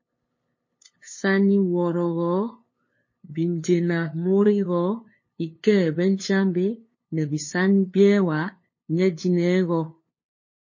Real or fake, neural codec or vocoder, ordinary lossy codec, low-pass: fake; codec, 16 kHz, 2 kbps, FunCodec, trained on LibriTTS, 25 frames a second; MP3, 32 kbps; 7.2 kHz